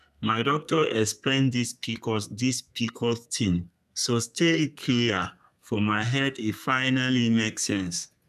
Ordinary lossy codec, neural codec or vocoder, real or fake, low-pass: none; codec, 32 kHz, 1.9 kbps, SNAC; fake; 14.4 kHz